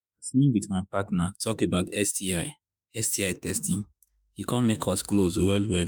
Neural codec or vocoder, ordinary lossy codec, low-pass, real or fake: autoencoder, 48 kHz, 32 numbers a frame, DAC-VAE, trained on Japanese speech; none; none; fake